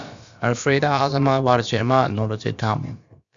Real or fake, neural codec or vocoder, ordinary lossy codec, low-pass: fake; codec, 16 kHz, about 1 kbps, DyCAST, with the encoder's durations; Opus, 64 kbps; 7.2 kHz